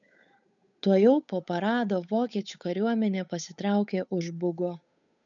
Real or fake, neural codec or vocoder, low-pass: fake; codec, 16 kHz, 8 kbps, FunCodec, trained on Chinese and English, 25 frames a second; 7.2 kHz